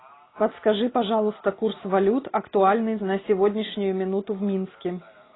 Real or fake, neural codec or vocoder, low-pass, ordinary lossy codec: real; none; 7.2 kHz; AAC, 16 kbps